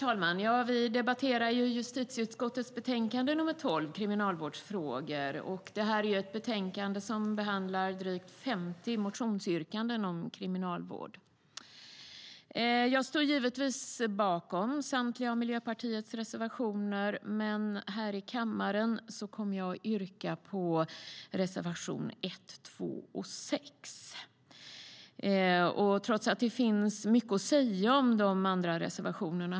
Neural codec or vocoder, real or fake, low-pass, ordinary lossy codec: none; real; none; none